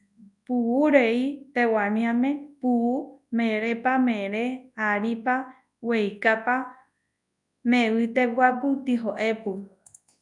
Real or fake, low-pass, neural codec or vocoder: fake; 10.8 kHz; codec, 24 kHz, 0.9 kbps, WavTokenizer, large speech release